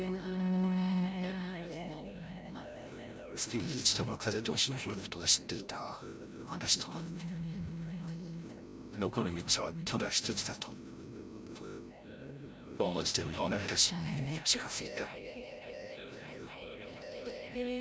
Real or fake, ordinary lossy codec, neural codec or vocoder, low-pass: fake; none; codec, 16 kHz, 0.5 kbps, FreqCodec, larger model; none